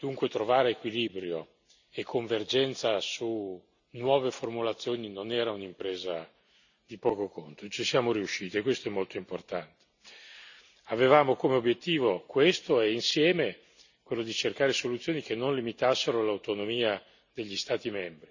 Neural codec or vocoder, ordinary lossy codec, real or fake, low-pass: none; none; real; 7.2 kHz